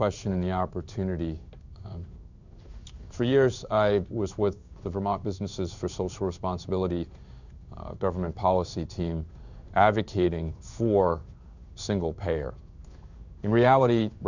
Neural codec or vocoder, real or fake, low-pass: codec, 16 kHz in and 24 kHz out, 1 kbps, XY-Tokenizer; fake; 7.2 kHz